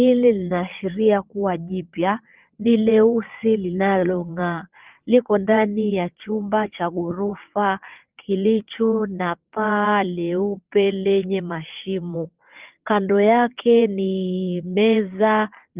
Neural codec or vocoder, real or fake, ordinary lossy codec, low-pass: vocoder, 22.05 kHz, 80 mel bands, WaveNeXt; fake; Opus, 32 kbps; 3.6 kHz